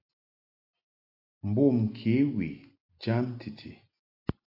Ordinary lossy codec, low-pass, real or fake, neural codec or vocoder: AAC, 48 kbps; 5.4 kHz; real; none